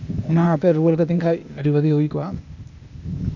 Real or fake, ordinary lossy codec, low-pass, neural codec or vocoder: fake; none; 7.2 kHz; codec, 16 kHz, 0.8 kbps, ZipCodec